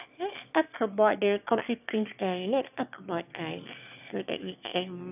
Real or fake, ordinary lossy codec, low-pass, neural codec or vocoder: fake; none; 3.6 kHz; autoencoder, 22.05 kHz, a latent of 192 numbers a frame, VITS, trained on one speaker